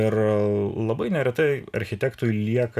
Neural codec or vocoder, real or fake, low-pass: none; real; 14.4 kHz